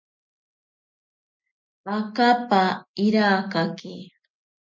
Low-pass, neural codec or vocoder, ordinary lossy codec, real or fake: 7.2 kHz; none; MP3, 48 kbps; real